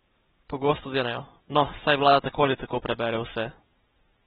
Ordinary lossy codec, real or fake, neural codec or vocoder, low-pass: AAC, 16 kbps; real; none; 19.8 kHz